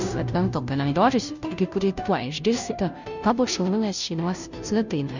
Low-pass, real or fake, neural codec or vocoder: 7.2 kHz; fake; codec, 16 kHz, 0.5 kbps, FunCodec, trained on Chinese and English, 25 frames a second